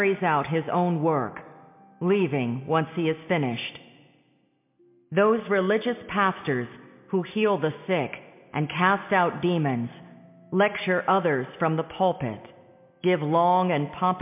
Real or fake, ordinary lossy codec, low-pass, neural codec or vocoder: real; MP3, 32 kbps; 3.6 kHz; none